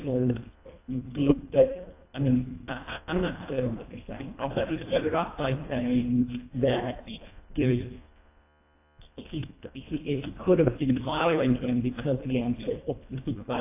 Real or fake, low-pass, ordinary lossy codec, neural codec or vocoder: fake; 3.6 kHz; AAC, 24 kbps; codec, 24 kHz, 1.5 kbps, HILCodec